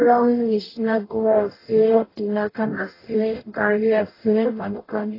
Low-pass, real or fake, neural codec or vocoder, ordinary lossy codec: 5.4 kHz; fake; codec, 44.1 kHz, 0.9 kbps, DAC; AAC, 24 kbps